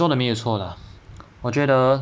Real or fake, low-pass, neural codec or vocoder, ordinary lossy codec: real; none; none; none